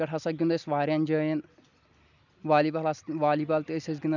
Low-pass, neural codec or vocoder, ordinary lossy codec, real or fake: 7.2 kHz; none; none; real